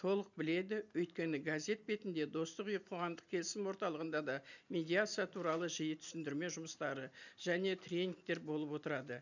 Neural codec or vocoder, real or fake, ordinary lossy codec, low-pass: none; real; none; 7.2 kHz